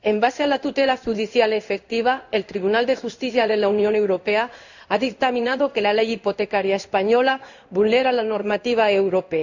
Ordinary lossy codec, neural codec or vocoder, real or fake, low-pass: none; codec, 16 kHz in and 24 kHz out, 1 kbps, XY-Tokenizer; fake; 7.2 kHz